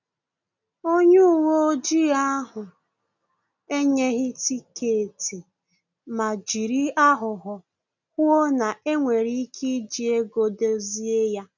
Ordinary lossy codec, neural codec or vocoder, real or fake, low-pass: none; none; real; 7.2 kHz